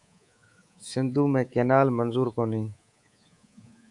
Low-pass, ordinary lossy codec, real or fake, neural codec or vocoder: 10.8 kHz; AAC, 64 kbps; fake; codec, 24 kHz, 3.1 kbps, DualCodec